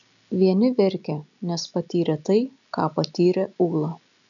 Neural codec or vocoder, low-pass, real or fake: none; 7.2 kHz; real